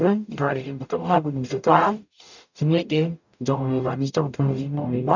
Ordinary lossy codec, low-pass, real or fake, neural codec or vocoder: none; 7.2 kHz; fake; codec, 44.1 kHz, 0.9 kbps, DAC